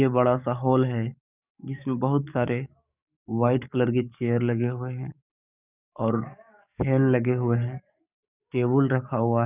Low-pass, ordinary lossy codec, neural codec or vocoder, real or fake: 3.6 kHz; none; codec, 44.1 kHz, 7.8 kbps, DAC; fake